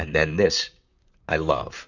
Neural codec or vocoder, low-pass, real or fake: vocoder, 44.1 kHz, 128 mel bands, Pupu-Vocoder; 7.2 kHz; fake